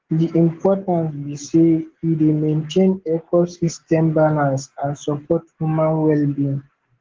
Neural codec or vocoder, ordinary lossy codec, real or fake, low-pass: none; Opus, 16 kbps; real; 7.2 kHz